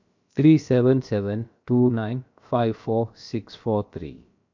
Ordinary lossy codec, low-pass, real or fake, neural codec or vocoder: MP3, 48 kbps; 7.2 kHz; fake; codec, 16 kHz, about 1 kbps, DyCAST, with the encoder's durations